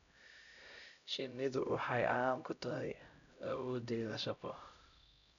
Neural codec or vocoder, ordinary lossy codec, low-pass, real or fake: codec, 16 kHz, 0.5 kbps, X-Codec, HuBERT features, trained on LibriSpeech; none; 7.2 kHz; fake